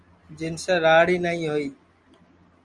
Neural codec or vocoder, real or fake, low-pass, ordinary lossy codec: none; real; 10.8 kHz; Opus, 32 kbps